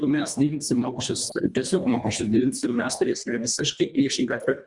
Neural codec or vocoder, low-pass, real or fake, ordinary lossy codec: codec, 24 kHz, 1.5 kbps, HILCodec; 10.8 kHz; fake; Opus, 64 kbps